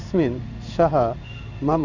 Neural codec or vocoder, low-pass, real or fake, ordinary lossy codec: codec, 16 kHz in and 24 kHz out, 1 kbps, XY-Tokenizer; 7.2 kHz; fake; none